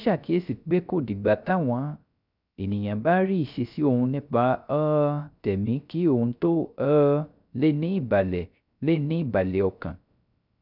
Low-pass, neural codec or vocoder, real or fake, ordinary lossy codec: 5.4 kHz; codec, 16 kHz, 0.3 kbps, FocalCodec; fake; none